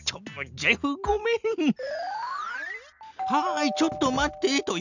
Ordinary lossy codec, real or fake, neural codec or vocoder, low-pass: none; real; none; 7.2 kHz